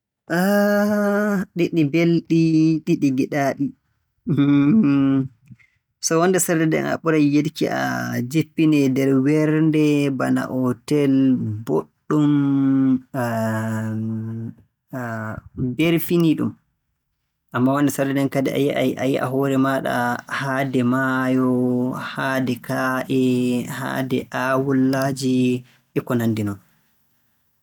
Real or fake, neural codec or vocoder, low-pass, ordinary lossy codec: real; none; 19.8 kHz; none